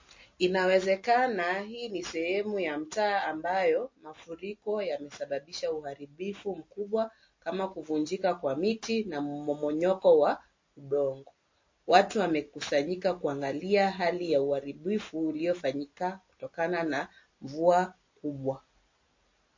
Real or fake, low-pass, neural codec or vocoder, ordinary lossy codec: real; 7.2 kHz; none; MP3, 32 kbps